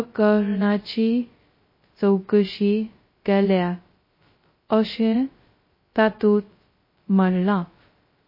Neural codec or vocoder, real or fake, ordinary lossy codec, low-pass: codec, 16 kHz, 0.2 kbps, FocalCodec; fake; MP3, 24 kbps; 5.4 kHz